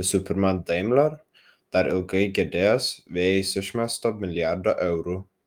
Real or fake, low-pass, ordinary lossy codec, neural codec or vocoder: fake; 19.8 kHz; Opus, 32 kbps; autoencoder, 48 kHz, 128 numbers a frame, DAC-VAE, trained on Japanese speech